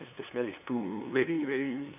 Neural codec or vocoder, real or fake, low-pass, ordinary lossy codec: codec, 16 kHz, 2 kbps, FunCodec, trained on LibriTTS, 25 frames a second; fake; 3.6 kHz; none